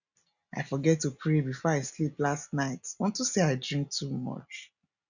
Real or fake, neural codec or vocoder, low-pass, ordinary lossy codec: real; none; 7.2 kHz; none